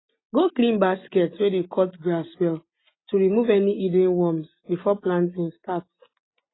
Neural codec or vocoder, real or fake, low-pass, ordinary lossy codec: none; real; 7.2 kHz; AAC, 16 kbps